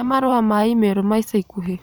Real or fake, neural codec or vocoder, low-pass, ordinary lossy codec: fake; vocoder, 44.1 kHz, 128 mel bands every 512 samples, BigVGAN v2; none; none